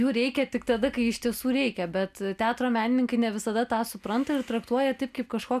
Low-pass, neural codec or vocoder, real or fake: 14.4 kHz; none; real